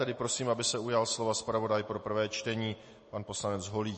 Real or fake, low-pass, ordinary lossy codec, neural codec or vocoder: real; 10.8 kHz; MP3, 32 kbps; none